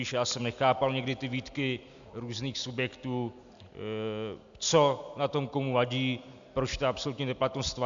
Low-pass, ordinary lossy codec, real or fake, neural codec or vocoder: 7.2 kHz; AAC, 64 kbps; real; none